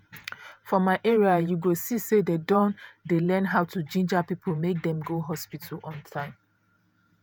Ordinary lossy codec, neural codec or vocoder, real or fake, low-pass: none; vocoder, 48 kHz, 128 mel bands, Vocos; fake; none